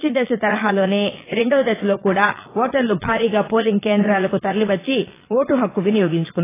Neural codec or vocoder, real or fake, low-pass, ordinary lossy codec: vocoder, 22.05 kHz, 80 mel bands, Vocos; fake; 3.6 kHz; AAC, 16 kbps